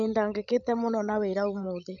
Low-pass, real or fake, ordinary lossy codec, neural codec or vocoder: 7.2 kHz; fake; none; codec, 16 kHz, 16 kbps, FreqCodec, larger model